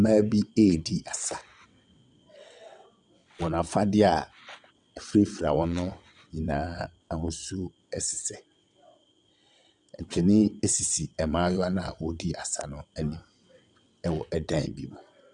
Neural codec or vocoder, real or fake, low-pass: vocoder, 22.05 kHz, 80 mel bands, WaveNeXt; fake; 9.9 kHz